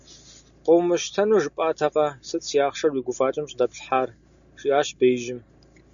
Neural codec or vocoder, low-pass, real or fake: none; 7.2 kHz; real